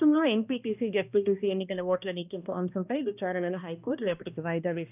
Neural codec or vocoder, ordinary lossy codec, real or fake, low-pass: codec, 16 kHz, 1 kbps, X-Codec, HuBERT features, trained on balanced general audio; none; fake; 3.6 kHz